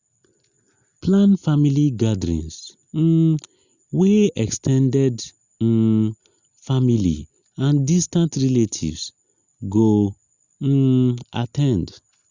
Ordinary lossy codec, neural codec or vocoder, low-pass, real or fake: Opus, 64 kbps; none; 7.2 kHz; real